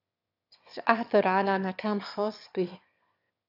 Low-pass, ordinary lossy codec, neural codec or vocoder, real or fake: 5.4 kHz; AAC, 48 kbps; autoencoder, 22.05 kHz, a latent of 192 numbers a frame, VITS, trained on one speaker; fake